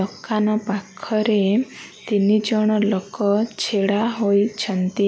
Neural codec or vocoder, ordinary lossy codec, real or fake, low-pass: none; none; real; none